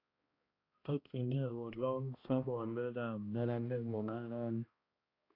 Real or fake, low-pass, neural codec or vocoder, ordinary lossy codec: fake; 5.4 kHz; codec, 16 kHz, 1 kbps, X-Codec, HuBERT features, trained on balanced general audio; AAC, 32 kbps